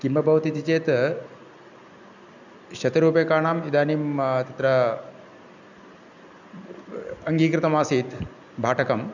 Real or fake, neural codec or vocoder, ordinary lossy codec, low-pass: real; none; none; 7.2 kHz